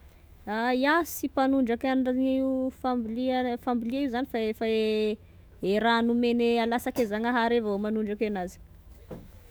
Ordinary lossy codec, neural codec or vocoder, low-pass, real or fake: none; autoencoder, 48 kHz, 128 numbers a frame, DAC-VAE, trained on Japanese speech; none; fake